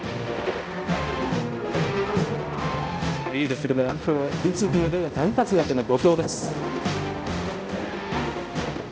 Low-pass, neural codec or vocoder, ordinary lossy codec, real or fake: none; codec, 16 kHz, 0.5 kbps, X-Codec, HuBERT features, trained on balanced general audio; none; fake